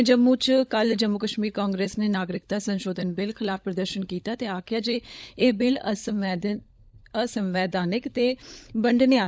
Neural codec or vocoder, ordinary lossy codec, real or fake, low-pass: codec, 16 kHz, 16 kbps, FunCodec, trained on LibriTTS, 50 frames a second; none; fake; none